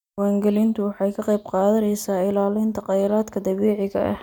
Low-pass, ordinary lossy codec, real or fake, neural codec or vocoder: 19.8 kHz; none; real; none